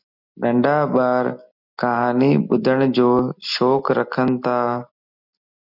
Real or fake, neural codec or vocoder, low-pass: real; none; 5.4 kHz